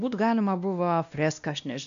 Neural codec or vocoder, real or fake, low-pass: codec, 16 kHz, 1 kbps, X-Codec, WavLM features, trained on Multilingual LibriSpeech; fake; 7.2 kHz